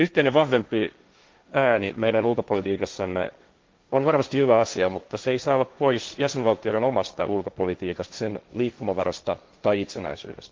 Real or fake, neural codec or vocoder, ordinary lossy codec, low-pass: fake; codec, 16 kHz, 1.1 kbps, Voila-Tokenizer; Opus, 32 kbps; 7.2 kHz